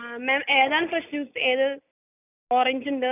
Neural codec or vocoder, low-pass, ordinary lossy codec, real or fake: none; 3.6 kHz; none; real